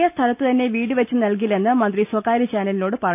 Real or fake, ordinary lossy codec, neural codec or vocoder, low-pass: real; none; none; 3.6 kHz